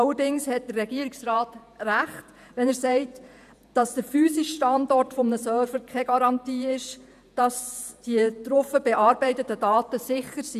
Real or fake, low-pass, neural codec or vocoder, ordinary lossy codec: fake; 14.4 kHz; vocoder, 48 kHz, 128 mel bands, Vocos; none